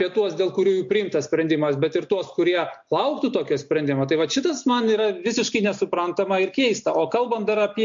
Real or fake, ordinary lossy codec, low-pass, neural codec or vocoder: real; MP3, 48 kbps; 7.2 kHz; none